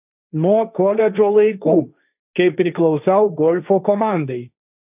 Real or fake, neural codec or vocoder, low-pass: fake; codec, 16 kHz, 1.1 kbps, Voila-Tokenizer; 3.6 kHz